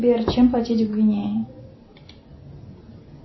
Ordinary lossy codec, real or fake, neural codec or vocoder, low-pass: MP3, 24 kbps; real; none; 7.2 kHz